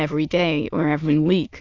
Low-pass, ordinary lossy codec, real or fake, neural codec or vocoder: 7.2 kHz; AAC, 48 kbps; fake; autoencoder, 22.05 kHz, a latent of 192 numbers a frame, VITS, trained on many speakers